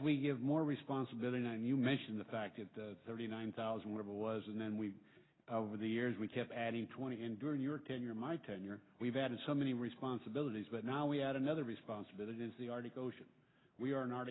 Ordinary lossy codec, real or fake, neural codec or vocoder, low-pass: AAC, 16 kbps; real; none; 7.2 kHz